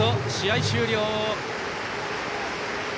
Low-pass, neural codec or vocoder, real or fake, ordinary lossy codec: none; none; real; none